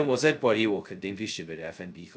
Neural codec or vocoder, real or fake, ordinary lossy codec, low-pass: codec, 16 kHz, 0.2 kbps, FocalCodec; fake; none; none